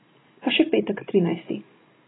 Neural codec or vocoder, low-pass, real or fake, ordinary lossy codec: none; 7.2 kHz; real; AAC, 16 kbps